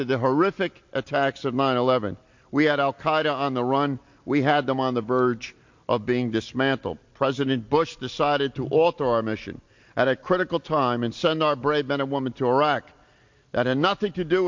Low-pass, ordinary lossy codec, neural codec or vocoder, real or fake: 7.2 kHz; MP3, 48 kbps; none; real